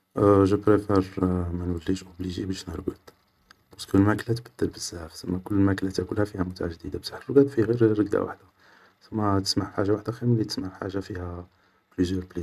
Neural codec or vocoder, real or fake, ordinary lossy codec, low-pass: none; real; none; 14.4 kHz